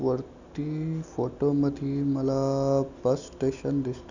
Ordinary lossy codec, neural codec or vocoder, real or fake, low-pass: none; none; real; 7.2 kHz